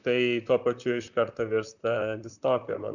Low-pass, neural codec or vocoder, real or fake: 7.2 kHz; vocoder, 44.1 kHz, 128 mel bands, Pupu-Vocoder; fake